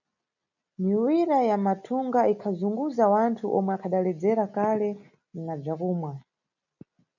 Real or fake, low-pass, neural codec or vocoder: real; 7.2 kHz; none